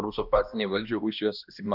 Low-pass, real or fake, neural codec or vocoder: 5.4 kHz; fake; codec, 16 kHz, 1 kbps, X-Codec, HuBERT features, trained on balanced general audio